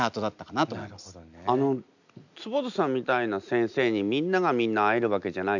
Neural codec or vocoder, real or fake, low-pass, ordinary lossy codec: none; real; 7.2 kHz; none